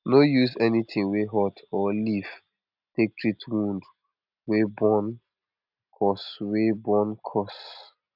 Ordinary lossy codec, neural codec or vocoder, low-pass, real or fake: none; none; 5.4 kHz; real